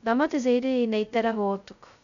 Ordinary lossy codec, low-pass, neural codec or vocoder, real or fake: none; 7.2 kHz; codec, 16 kHz, 0.2 kbps, FocalCodec; fake